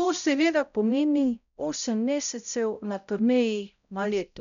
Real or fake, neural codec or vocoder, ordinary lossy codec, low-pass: fake; codec, 16 kHz, 0.5 kbps, X-Codec, HuBERT features, trained on balanced general audio; none; 7.2 kHz